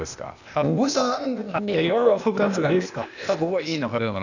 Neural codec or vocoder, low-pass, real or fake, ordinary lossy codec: codec, 16 kHz, 0.8 kbps, ZipCodec; 7.2 kHz; fake; none